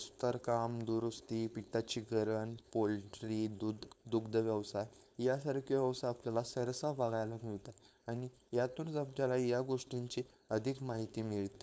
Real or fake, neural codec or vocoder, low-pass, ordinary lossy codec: fake; codec, 16 kHz, 4.8 kbps, FACodec; none; none